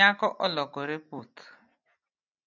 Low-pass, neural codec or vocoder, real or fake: 7.2 kHz; none; real